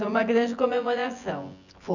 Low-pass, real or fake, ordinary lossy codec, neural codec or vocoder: 7.2 kHz; fake; none; vocoder, 24 kHz, 100 mel bands, Vocos